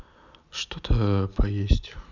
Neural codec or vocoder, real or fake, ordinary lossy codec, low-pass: none; real; none; 7.2 kHz